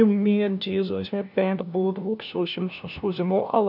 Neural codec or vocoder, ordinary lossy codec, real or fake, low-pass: codec, 16 kHz, 1 kbps, FunCodec, trained on LibriTTS, 50 frames a second; none; fake; 5.4 kHz